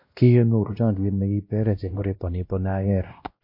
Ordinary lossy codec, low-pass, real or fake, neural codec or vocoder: MP3, 32 kbps; 5.4 kHz; fake; codec, 16 kHz, 1 kbps, X-Codec, WavLM features, trained on Multilingual LibriSpeech